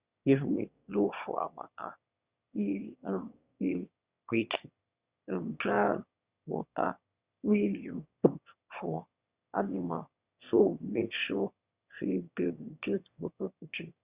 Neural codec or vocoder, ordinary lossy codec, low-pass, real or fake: autoencoder, 22.05 kHz, a latent of 192 numbers a frame, VITS, trained on one speaker; Opus, 64 kbps; 3.6 kHz; fake